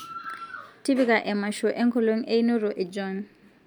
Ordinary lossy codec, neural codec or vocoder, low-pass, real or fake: MP3, 96 kbps; none; 19.8 kHz; real